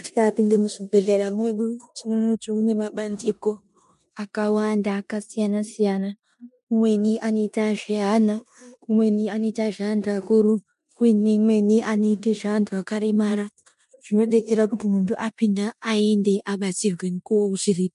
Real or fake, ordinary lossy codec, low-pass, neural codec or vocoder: fake; MP3, 64 kbps; 10.8 kHz; codec, 16 kHz in and 24 kHz out, 0.9 kbps, LongCat-Audio-Codec, four codebook decoder